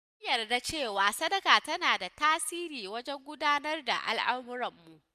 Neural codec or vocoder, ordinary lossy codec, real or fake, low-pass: none; none; real; 14.4 kHz